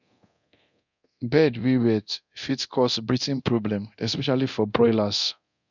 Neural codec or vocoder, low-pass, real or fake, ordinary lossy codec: codec, 24 kHz, 0.9 kbps, DualCodec; 7.2 kHz; fake; none